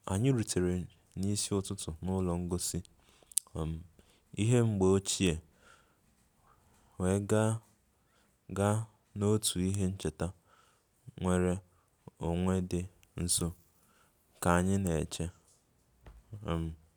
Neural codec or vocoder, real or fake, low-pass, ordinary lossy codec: none; real; none; none